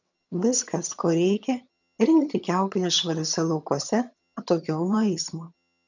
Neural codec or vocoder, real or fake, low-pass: vocoder, 22.05 kHz, 80 mel bands, HiFi-GAN; fake; 7.2 kHz